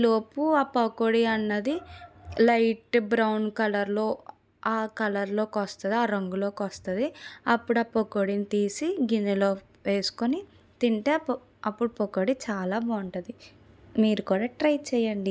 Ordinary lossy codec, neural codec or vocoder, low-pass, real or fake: none; none; none; real